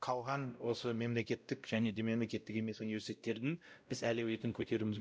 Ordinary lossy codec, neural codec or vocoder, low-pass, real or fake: none; codec, 16 kHz, 0.5 kbps, X-Codec, WavLM features, trained on Multilingual LibriSpeech; none; fake